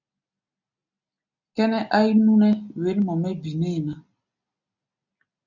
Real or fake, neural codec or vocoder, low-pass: real; none; 7.2 kHz